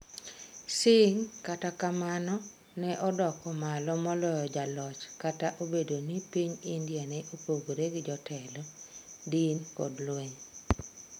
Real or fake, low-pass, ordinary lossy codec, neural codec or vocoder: real; none; none; none